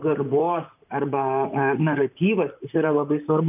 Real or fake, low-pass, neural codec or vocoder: fake; 3.6 kHz; vocoder, 44.1 kHz, 128 mel bands, Pupu-Vocoder